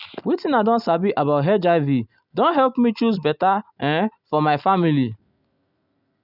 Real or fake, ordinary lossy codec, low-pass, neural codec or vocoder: real; none; 5.4 kHz; none